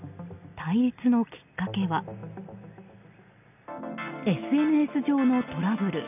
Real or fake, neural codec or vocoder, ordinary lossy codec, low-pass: real; none; none; 3.6 kHz